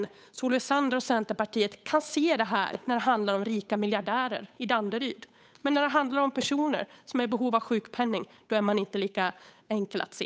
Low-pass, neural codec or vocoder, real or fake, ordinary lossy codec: none; codec, 16 kHz, 8 kbps, FunCodec, trained on Chinese and English, 25 frames a second; fake; none